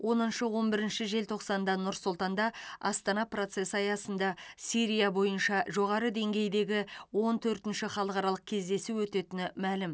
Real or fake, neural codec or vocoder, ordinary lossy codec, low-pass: real; none; none; none